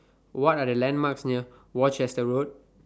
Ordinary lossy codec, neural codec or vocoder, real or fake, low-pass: none; none; real; none